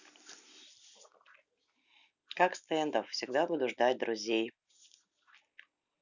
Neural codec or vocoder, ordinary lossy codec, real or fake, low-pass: none; none; real; 7.2 kHz